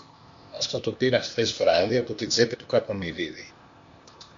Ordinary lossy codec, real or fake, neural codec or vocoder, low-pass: MP3, 48 kbps; fake; codec, 16 kHz, 0.8 kbps, ZipCodec; 7.2 kHz